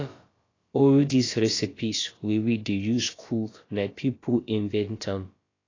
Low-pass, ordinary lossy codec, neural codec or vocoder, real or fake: 7.2 kHz; AAC, 32 kbps; codec, 16 kHz, about 1 kbps, DyCAST, with the encoder's durations; fake